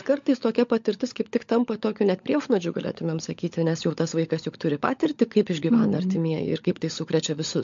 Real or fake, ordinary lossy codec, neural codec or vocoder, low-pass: fake; MP3, 48 kbps; codec, 16 kHz, 16 kbps, FunCodec, trained on LibriTTS, 50 frames a second; 7.2 kHz